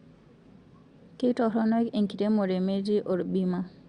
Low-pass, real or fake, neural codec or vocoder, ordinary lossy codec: 9.9 kHz; real; none; Opus, 64 kbps